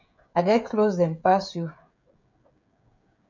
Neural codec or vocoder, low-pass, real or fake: codec, 16 kHz, 16 kbps, FreqCodec, smaller model; 7.2 kHz; fake